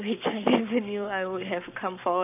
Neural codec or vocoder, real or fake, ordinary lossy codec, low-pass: codec, 44.1 kHz, 7.8 kbps, Pupu-Codec; fake; none; 3.6 kHz